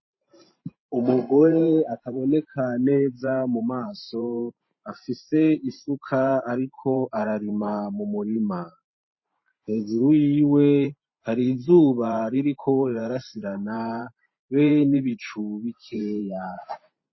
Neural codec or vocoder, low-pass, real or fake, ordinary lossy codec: vocoder, 44.1 kHz, 128 mel bands every 512 samples, BigVGAN v2; 7.2 kHz; fake; MP3, 24 kbps